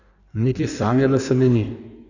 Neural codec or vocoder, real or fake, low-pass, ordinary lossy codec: codec, 16 kHz in and 24 kHz out, 1.1 kbps, FireRedTTS-2 codec; fake; 7.2 kHz; none